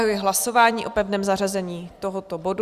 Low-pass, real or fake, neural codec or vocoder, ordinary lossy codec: 14.4 kHz; real; none; Opus, 64 kbps